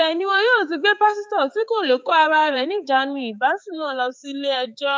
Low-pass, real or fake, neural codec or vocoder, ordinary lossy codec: none; fake; codec, 16 kHz, 4 kbps, X-Codec, HuBERT features, trained on balanced general audio; none